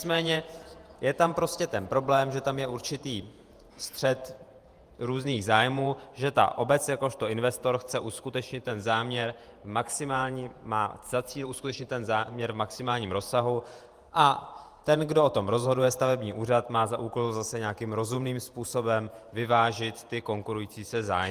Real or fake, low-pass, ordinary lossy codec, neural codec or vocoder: fake; 14.4 kHz; Opus, 32 kbps; vocoder, 48 kHz, 128 mel bands, Vocos